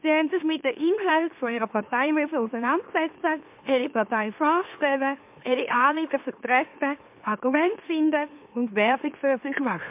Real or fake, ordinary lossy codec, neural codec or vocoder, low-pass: fake; MP3, 32 kbps; autoencoder, 44.1 kHz, a latent of 192 numbers a frame, MeloTTS; 3.6 kHz